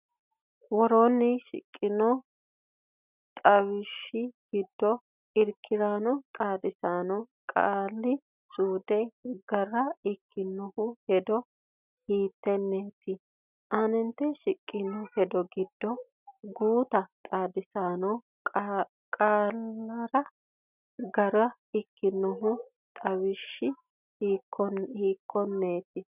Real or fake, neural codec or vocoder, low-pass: real; none; 3.6 kHz